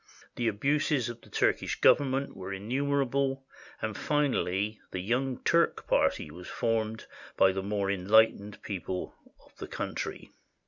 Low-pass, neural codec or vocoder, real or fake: 7.2 kHz; none; real